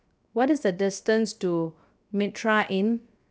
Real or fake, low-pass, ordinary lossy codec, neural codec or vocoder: fake; none; none; codec, 16 kHz, 0.7 kbps, FocalCodec